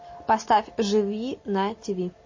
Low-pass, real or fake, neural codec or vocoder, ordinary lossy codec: 7.2 kHz; real; none; MP3, 32 kbps